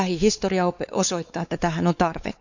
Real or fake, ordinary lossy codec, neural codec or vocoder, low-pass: fake; none; codec, 16 kHz, 4 kbps, X-Codec, WavLM features, trained on Multilingual LibriSpeech; 7.2 kHz